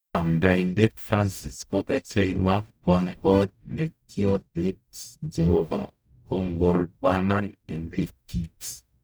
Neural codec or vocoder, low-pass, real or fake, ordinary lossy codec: codec, 44.1 kHz, 0.9 kbps, DAC; none; fake; none